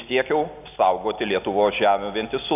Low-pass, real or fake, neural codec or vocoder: 3.6 kHz; real; none